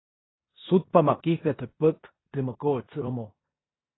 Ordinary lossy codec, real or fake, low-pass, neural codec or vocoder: AAC, 16 kbps; fake; 7.2 kHz; codec, 16 kHz in and 24 kHz out, 0.9 kbps, LongCat-Audio-Codec, four codebook decoder